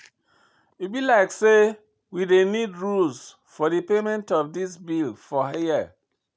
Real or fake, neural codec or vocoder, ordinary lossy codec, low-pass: real; none; none; none